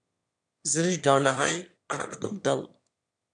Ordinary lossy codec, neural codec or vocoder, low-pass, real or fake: AAC, 64 kbps; autoencoder, 22.05 kHz, a latent of 192 numbers a frame, VITS, trained on one speaker; 9.9 kHz; fake